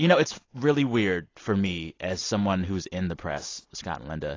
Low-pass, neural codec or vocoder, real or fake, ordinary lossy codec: 7.2 kHz; none; real; AAC, 32 kbps